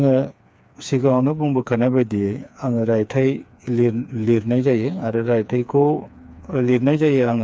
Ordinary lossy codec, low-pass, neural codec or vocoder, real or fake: none; none; codec, 16 kHz, 4 kbps, FreqCodec, smaller model; fake